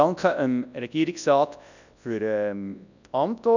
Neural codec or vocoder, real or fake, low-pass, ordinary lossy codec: codec, 24 kHz, 0.9 kbps, WavTokenizer, large speech release; fake; 7.2 kHz; none